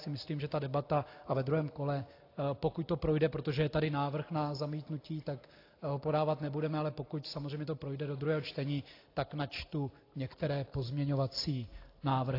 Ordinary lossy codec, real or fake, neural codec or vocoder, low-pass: AAC, 32 kbps; real; none; 5.4 kHz